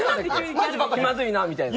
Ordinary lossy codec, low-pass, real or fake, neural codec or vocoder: none; none; real; none